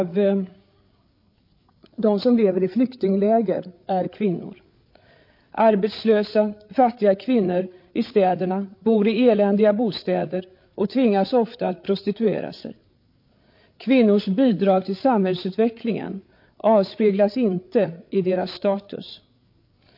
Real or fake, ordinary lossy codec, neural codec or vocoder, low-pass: fake; MP3, 32 kbps; codec, 16 kHz, 8 kbps, FreqCodec, larger model; 5.4 kHz